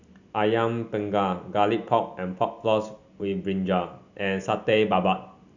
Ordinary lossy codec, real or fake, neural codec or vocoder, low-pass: none; real; none; 7.2 kHz